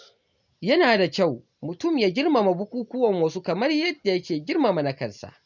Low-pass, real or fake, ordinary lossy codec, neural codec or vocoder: 7.2 kHz; real; none; none